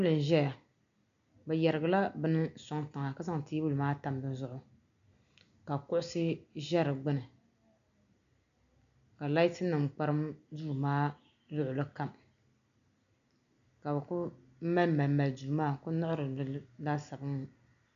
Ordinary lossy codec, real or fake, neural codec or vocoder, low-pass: MP3, 96 kbps; real; none; 7.2 kHz